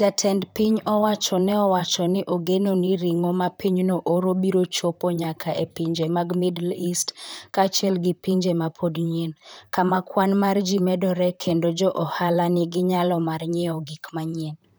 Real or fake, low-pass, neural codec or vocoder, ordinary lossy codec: fake; none; vocoder, 44.1 kHz, 128 mel bands, Pupu-Vocoder; none